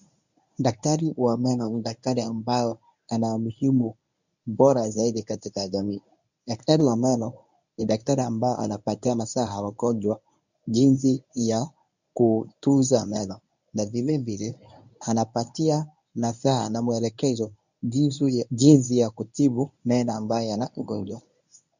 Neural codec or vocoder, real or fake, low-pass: codec, 24 kHz, 0.9 kbps, WavTokenizer, medium speech release version 1; fake; 7.2 kHz